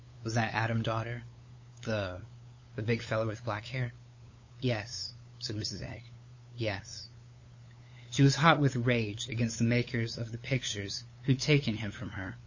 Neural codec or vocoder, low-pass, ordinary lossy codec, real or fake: codec, 16 kHz, 8 kbps, FunCodec, trained on LibriTTS, 25 frames a second; 7.2 kHz; MP3, 32 kbps; fake